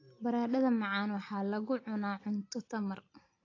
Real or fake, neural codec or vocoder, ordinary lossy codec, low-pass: real; none; AAC, 32 kbps; 7.2 kHz